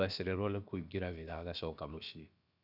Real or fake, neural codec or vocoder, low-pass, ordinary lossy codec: fake; codec, 16 kHz, about 1 kbps, DyCAST, with the encoder's durations; 5.4 kHz; none